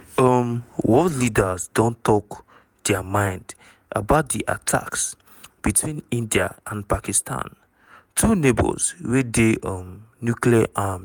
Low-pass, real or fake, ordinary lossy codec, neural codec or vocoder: none; fake; none; vocoder, 48 kHz, 128 mel bands, Vocos